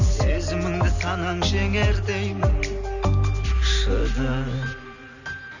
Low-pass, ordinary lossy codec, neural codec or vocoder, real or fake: 7.2 kHz; none; none; real